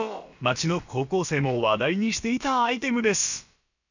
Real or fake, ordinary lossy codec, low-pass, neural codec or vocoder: fake; none; 7.2 kHz; codec, 16 kHz, about 1 kbps, DyCAST, with the encoder's durations